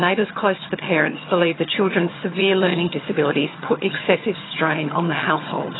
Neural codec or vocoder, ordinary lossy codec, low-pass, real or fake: vocoder, 22.05 kHz, 80 mel bands, HiFi-GAN; AAC, 16 kbps; 7.2 kHz; fake